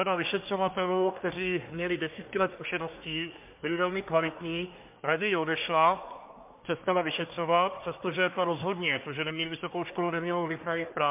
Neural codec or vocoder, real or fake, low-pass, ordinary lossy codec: codec, 24 kHz, 1 kbps, SNAC; fake; 3.6 kHz; MP3, 32 kbps